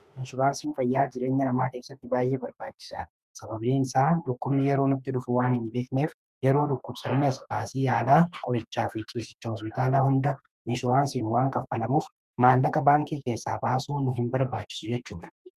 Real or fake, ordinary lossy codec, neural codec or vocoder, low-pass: fake; Opus, 64 kbps; autoencoder, 48 kHz, 32 numbers a frame, DAC-VAE, trained on Japanese speech; 14.4 kHz